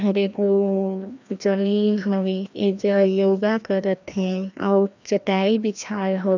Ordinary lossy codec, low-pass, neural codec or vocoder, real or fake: none; 7.2 kHz; codec, 16 kHz, 1 kbps, FreqCodec, larger model; fake